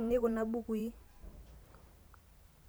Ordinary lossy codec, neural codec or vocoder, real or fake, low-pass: none; vocoder, 44.1 kHz, 128 mel bands every 512 samples, BigVGAN v2; fake; none